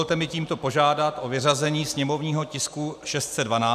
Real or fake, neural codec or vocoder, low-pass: real; none; 14.4 kHz